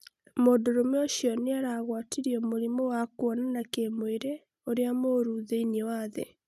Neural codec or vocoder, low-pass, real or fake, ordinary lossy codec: none; 14.4 kHz; real; none